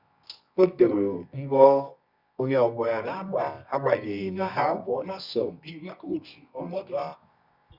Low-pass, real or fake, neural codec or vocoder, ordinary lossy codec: 5.4 kHz; fake; codec, 24 kHz, 0.9 kbps, WavTokenizer, medium music audio release; Opus, 64 kbps